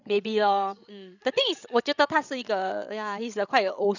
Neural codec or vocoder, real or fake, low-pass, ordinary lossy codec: codec, 16 kHz, 16 kbps, FreqCodec, larger model; fake; 7.2 kHz; none